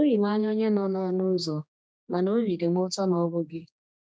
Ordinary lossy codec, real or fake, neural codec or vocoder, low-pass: none; fake; codec, 16 kHz, 2 kbps, X-Codec, HuBERT features, trained on general audio; none